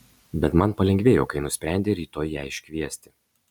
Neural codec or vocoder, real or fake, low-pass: none; real; 19.8 kHz